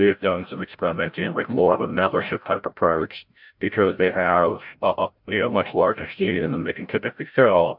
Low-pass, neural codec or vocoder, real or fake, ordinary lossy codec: 5.4 kHz; codec, 16 kHz, 0.5 kbps, FreqCodec, larger model; fake; MP3, 48 kbps